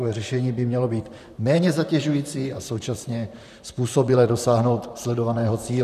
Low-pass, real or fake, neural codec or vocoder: 14.4 kHz; fake; vocoder, 44.1 kHz, 128 mel bands, Pupu-Vocoder